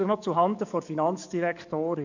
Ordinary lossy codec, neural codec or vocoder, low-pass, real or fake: none; codec, 44.1 kHz, 7.8 kbps, DAC; 7.2 kHz; fake